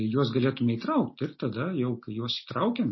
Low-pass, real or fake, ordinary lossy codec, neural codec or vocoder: 7.2 kHz; real; MP3, 24 kbps; none